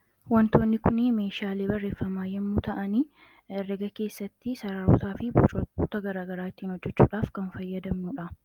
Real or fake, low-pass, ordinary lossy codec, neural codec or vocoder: real; 19.8 kHz; Opus, 32 kbps; none